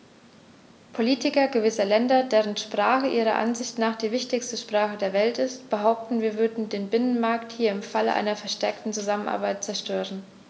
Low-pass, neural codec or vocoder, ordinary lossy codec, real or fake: none; none; none; real